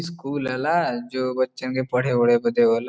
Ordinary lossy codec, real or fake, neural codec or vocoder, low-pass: none; real; none; none